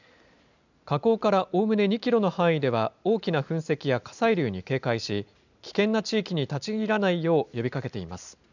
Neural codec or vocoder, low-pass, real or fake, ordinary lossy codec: none; 7.2 kHz; real; none